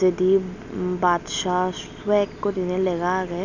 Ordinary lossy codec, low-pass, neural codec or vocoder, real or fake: none; 7.2 kHz; none; real